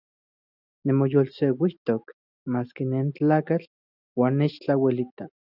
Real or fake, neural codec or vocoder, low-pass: real; none; 5.4 kHz